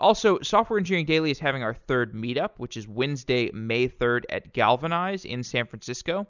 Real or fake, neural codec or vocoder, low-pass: real; none; 7.2 kHz